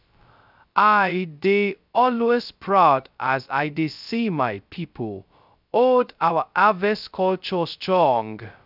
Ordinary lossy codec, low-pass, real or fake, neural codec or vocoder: none; 5.4 kHz; fake; codec, 16 kHz, 0.2 kbps, FocalCodec